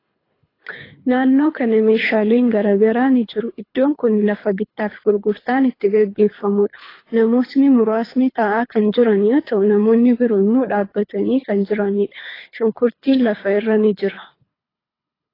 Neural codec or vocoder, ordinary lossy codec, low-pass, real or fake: codec, 24 kHz, 3 kbps, HILCodec; AAC, 24 kbps; 5.4 kHz; fake